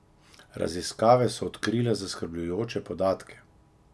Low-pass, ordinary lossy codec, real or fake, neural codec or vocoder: none; none; real; none